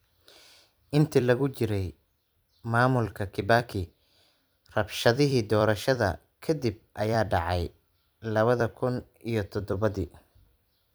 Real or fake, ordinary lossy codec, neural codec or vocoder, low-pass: fake; none; vocoder, 44.1 kHz, 128 mel bands every 256 samples, BigVGAN v2; none